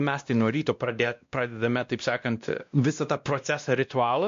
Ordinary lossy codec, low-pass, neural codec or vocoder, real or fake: MP3, 48 kbps; 7.2 kHz; codec, 16 kHz, 1 kbps, X-Codec, WavLM features, trained on Multilingual LibriSpeech; fake